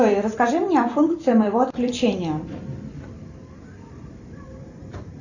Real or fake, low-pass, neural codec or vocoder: fake; 7.2 kHz; vocoder, 44.1 kHz, 128 mel bands every 256 samples, BigVGAN v2